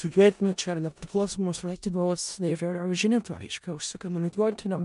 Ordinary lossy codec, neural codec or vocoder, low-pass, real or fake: MP3, 64 kbps; codec, 16 kHz in and 24 kHz out, 0.4 kbps, LongCat-Audio-Codec, four codebook decoder; 10.8 kHz; fake